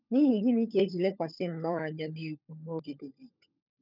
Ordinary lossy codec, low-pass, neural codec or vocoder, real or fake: none; 5.4 kHz; codec, 16 kHz, 16 kbps, FunCodec, trained on LibriTTS, 50 frames a second; fake